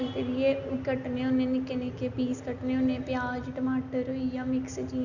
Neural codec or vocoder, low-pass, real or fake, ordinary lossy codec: none; 7.2 kHz; real; none